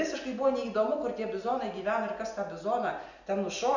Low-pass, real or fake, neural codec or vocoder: 7.2 kHz; real; none